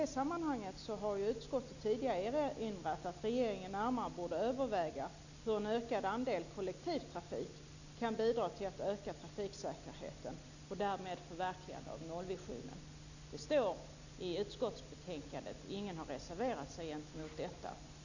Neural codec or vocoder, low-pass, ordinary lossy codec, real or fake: none; 7.2 kHz; none; real